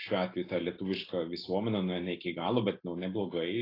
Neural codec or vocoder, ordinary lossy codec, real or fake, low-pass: none; AAC, 24 kbps; real; 5.4 kHz